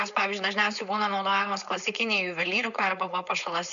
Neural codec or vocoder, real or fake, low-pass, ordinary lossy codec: codec, 16 kHz, 4.8 kbps, FACodec; fake; 7.2 kHz; MP3, 96 kbps